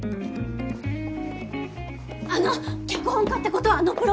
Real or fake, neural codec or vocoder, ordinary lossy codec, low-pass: real; none; none; none